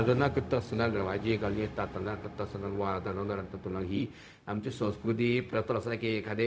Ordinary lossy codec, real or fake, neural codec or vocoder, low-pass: none; fake; codec, 16 kHz, 0.4 kbps, LongCat-Audio-Codec; none